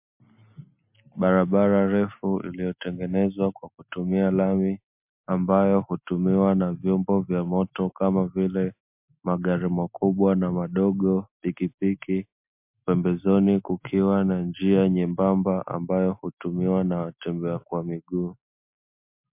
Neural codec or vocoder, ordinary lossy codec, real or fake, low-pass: none; MP3, 32 kbps; real; 3.6 kHz